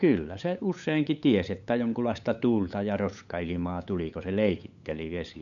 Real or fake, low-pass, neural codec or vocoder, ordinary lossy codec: fake; 7.2 kHz; codec, 16 kHz, 8 kbps, FunCodec, trained on LibriTTS, 25 frames a second; none